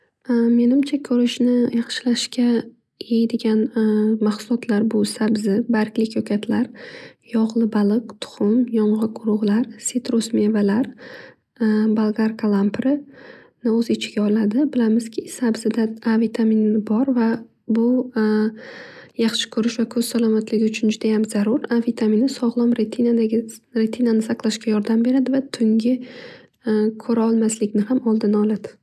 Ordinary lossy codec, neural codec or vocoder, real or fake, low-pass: none; none; real; none